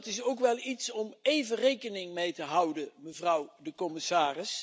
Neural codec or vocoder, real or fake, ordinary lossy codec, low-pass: none; real; none; none